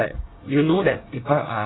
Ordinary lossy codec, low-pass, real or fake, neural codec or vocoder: AAC, 16 kbps; 7.2 kHz; fake; codec, 24 kHz, 1 kbps, SNAC